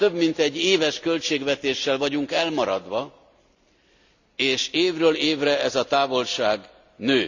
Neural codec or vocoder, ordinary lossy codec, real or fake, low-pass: none; none; real; 7.2 kHz